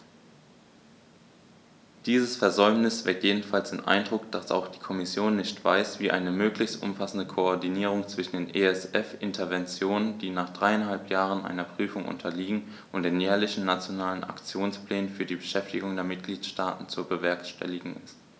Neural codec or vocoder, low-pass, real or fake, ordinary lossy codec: none; none; real; none